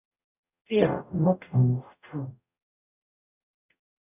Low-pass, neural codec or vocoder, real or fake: 3.6 kHz; codec, 44.1 kHz, 0.9 kbps, DAC; fake